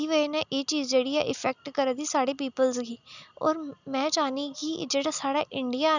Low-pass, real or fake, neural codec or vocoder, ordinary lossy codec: 7.2 kHz; real; none; none